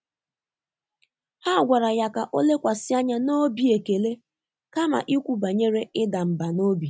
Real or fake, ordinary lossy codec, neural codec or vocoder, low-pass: real; none; none; none